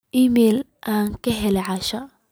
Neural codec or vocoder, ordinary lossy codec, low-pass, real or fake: none; none; none; real